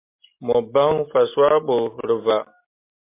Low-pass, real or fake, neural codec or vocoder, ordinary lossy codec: 3.6 kHz; real; none; MP3, 32 kbps